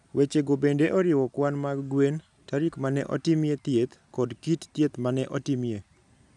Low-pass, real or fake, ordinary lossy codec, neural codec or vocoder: 10.8 kHz; real; none; none